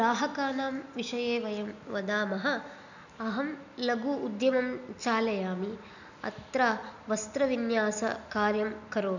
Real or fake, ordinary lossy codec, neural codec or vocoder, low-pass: real; none; none; 7.2 kHz